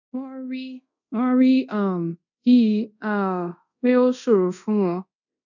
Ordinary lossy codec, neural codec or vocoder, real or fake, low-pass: none; codec, 24 kHz, 0.5 kbps, DualCodec; fake; 7.2 kHz